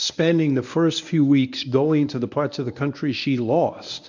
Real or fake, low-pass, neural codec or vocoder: fake; 7.2 kHz; codec, 24 kHz, 0.9 kbps, WavTokenizer, medium speech release version 1